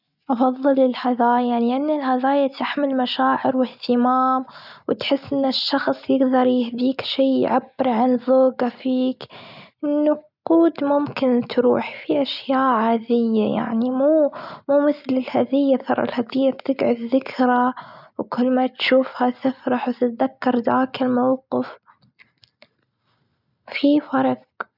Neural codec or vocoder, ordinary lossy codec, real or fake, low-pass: none; none; real; 5.4 kHz